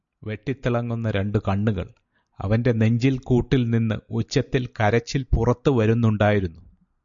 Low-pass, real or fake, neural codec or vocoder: 7.2 kHz; real; none